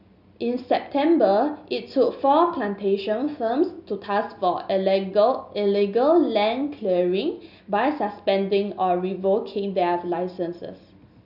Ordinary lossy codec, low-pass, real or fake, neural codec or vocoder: none; 5.4 kHz; real; none